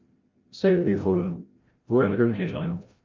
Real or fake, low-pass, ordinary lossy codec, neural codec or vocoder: fake; 7.2 kHz; Opus, 24 kbps; codec, 16 kHz, 0.5 kbps, FreqCodec, larger model